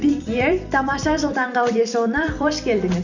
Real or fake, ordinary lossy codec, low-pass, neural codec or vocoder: fake; none; 7.2 kHz; vocoder, 44.1 kHz, 128 mel bands every 256 samples, BigVGAN v2